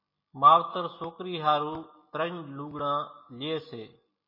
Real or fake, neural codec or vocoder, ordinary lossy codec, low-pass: real; none; MP3, 24 kbps; 5.4 kHz